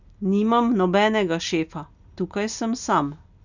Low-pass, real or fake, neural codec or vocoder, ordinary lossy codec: 7.2 kHz; real; none; none